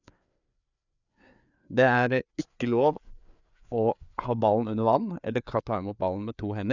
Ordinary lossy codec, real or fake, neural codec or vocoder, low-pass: none; fake; codec, 16 kHz, 4 kbps, FreqCodec, larger model; 7.2 kHz